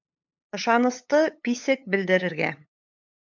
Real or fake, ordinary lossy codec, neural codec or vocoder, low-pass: fake; MP3, 64 kbps; codec, 16 kHz, 8 kbps, FunCodec, trained on LibriTTS, 25 frames a second; 7.2 kHz